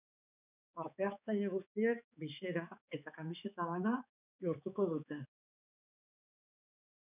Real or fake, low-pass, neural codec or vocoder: fake; 3.6 kHz; codec, 16 kHz, 4 kbps, X-Codec, HuBERT features, trained on balanced general audio